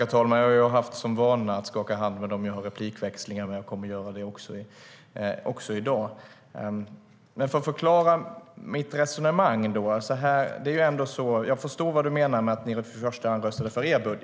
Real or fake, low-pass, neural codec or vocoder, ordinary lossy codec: real; none; none; none